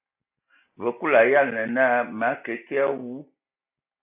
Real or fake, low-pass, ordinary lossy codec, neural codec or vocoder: real; 3.6 kHz; AAC, 32 kbps; none